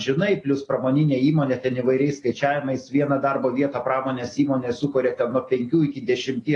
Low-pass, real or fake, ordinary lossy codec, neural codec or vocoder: 10.8 kHz; real; AAC, 32 kbps; none